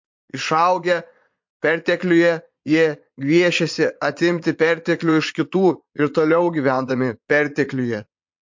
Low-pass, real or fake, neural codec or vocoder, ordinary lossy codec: 7.2 kHz; fake; vocoder, 22.05 kHz, 80 mel bands, Vocos; MP3, 48 kbps